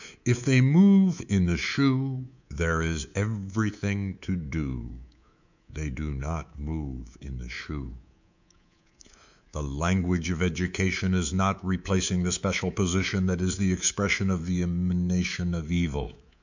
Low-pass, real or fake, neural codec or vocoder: 7.2 kHz; fake; codec, 24 kHz, 3.1 kbps, DualCodec